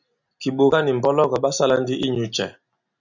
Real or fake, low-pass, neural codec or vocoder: real; 7.2 kHz; none